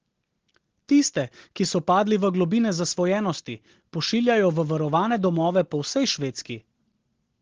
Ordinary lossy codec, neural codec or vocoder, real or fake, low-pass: Opus, 16 kbps; none; real; 7.2 kHz